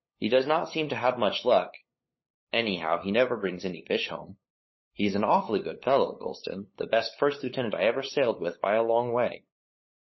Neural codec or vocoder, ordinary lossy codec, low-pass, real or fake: codec, 16 kHz, 8 kbps, FunCodec, trained on LibriTTS, 25 frames a second; MP3, 24 kbps; 7.2 kHz; fake